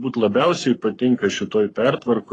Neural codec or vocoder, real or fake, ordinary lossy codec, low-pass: codec, 44.1 kHz, 7.8 kbps, Pupu-Codec; fake; AAC, 32 kbps; 10.8 kHz